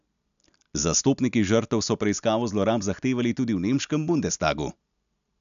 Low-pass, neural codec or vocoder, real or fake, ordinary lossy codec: 7.2 kHz; none; real; none